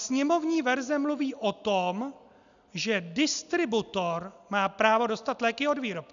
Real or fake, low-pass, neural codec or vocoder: real; 7.2 kHz; none